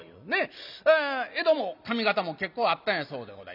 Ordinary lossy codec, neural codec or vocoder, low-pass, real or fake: none; none; 5.4 kHz; real